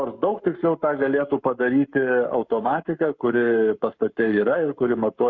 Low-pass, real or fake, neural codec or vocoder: 7.2 kHz; fake; codec, 44.1 kHz, 7.8 kbps, Pupu-Codec